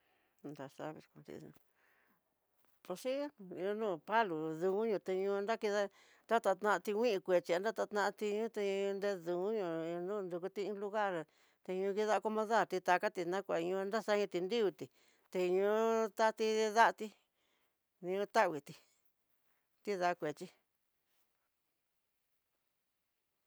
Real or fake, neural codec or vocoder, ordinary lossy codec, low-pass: real; none; none; none